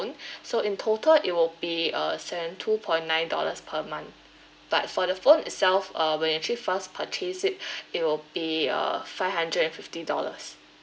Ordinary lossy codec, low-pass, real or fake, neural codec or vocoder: none; none; real; none